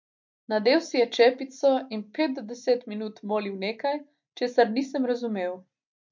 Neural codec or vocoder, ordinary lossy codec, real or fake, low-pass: none; MP3, 48 kbps; real; 7.2 kHz